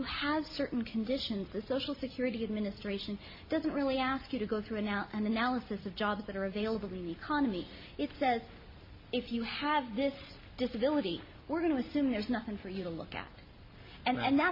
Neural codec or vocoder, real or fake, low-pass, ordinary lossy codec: none; real; 5.4 kHz; MP3, 24 kbps